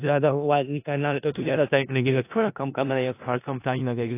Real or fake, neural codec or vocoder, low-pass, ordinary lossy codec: fake; codec, 16 kHz in and 24 kHz out, 0.4 kbps, LongCat-Audio-Codec, four codebook decoder; 3.6 kHz; AAC, 24 kbps